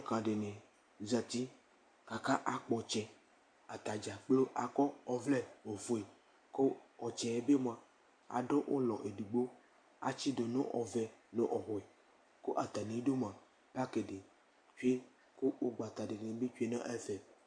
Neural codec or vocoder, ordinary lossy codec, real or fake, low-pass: none; MP3, 64 kbps; real; 9.9 kHz